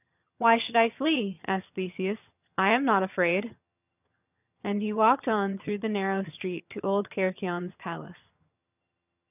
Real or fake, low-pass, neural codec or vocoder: fake; 3.6 kHz; vocoder, 22.05 kHz, 80 mel bands, HiFi-GAN